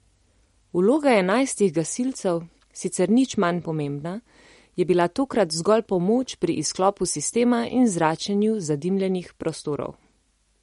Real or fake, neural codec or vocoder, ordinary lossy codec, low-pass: real; none; MP3, 48 kbps; 19.8 kHz